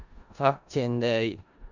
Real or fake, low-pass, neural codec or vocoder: fake; 7.2 kHz; codec, 16 kHz in and 24 kHz out, 0.4 kbps, LongCat-Audio-Codec, four codebook decoder